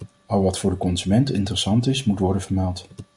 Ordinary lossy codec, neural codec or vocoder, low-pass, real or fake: Opus, 64 kbps; none; 10.8 kHz; real